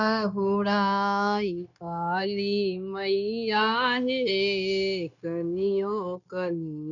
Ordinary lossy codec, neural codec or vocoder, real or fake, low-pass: none; codec, 16 kHz, 0.9 kbps, LongCat-Audio-Codec; fake; 7.2 kHz